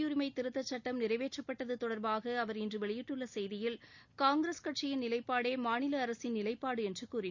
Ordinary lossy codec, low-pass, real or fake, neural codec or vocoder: none; 7.2 kHz; real; none